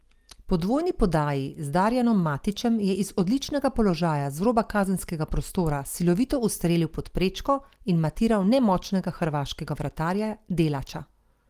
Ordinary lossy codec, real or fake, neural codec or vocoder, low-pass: Opus, 24 kbps; real; none; 14.4 kHz